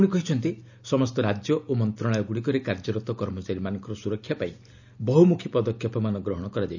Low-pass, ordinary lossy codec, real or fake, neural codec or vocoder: 7.2 kHz; none; real; none